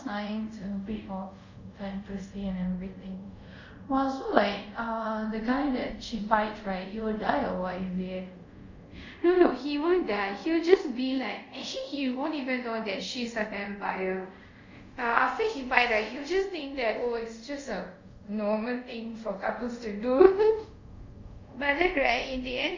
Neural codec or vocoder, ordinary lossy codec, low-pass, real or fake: codec, 24 kHz, 0.5 kbps, DualCodec; MP3, 48 kbps; 7.2 kHz; fake